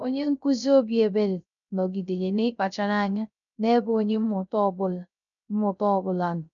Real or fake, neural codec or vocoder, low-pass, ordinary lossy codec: fake; codec, 16 kHz, 0.3 kbps, FocalCodec; 7.2 kHz; none